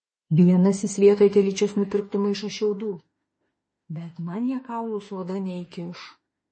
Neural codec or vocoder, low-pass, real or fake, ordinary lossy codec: autoencoder, 48 kHz, 32 numbers a frame, DAC-VAE, trained on Japanese speech; 9.9 kHz; fake; MP3, 32 kbps